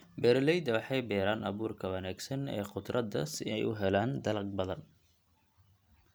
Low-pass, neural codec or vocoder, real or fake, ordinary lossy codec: none; none; real; none